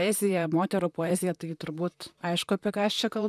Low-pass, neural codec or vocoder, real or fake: 14.4 kHz; vocoder, 44.1 kHz, 128 mel bands, Pupu-Vocoder; fake